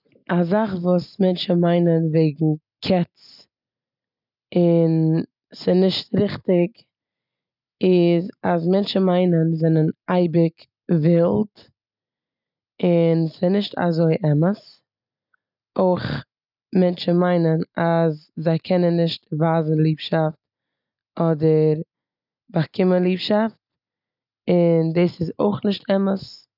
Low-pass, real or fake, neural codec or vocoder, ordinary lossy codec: 5.4 kHz; real; none; none